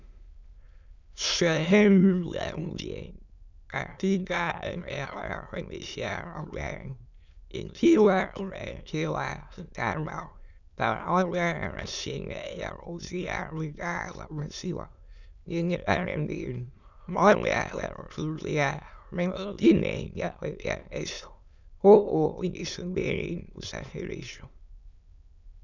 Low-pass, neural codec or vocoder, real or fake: 7.2 kHz; autoencoder, 22.05 kHz, a latent of 192 numbers a frame, VITS, trained on many speakers; fake